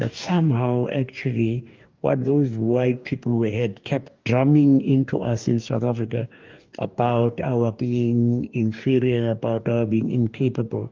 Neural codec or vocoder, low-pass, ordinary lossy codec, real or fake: codec, 44.1 kHz, 2.6 kbps, DAC; 7.2 kHz; Opus, 24 kbps; fake